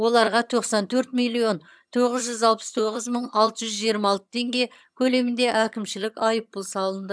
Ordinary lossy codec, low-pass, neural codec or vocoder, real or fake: none; none; vocoder, 22.05 kHz, 80 mel bands, HiFi-GAN; fake